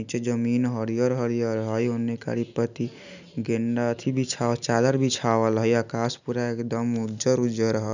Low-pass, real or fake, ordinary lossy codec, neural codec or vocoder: 7.2 kHz; real; none; none